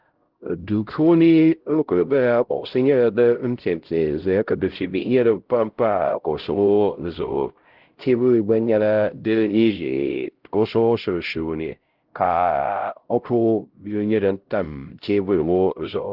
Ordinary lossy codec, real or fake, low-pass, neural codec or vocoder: Opus, 16 kbps; fake; 5.4 kHz; codec, 16 kHz, 0.5 kbps, X-Codec, HuBERT features, trained on LibriSpeech